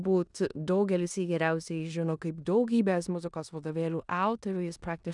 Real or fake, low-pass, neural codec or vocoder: fake; 10.8 kHz; codec, 16 kHz in and 24 kHz out, 0.9 kbps, LongCat-Audio-Codec, four codebook decoder